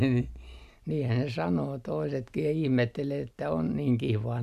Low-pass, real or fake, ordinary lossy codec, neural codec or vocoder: 14.4 kHz; real; none; none